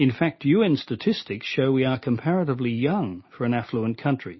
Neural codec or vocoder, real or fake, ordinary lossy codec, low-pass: none; real; MP3, 24 kbps; 7.2 kHz